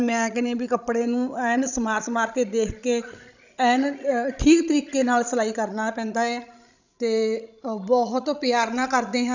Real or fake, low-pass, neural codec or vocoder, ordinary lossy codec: fake; 7.2 kHz; codec, 16 kHz, 16 kbps, FreqCodec, larger model; none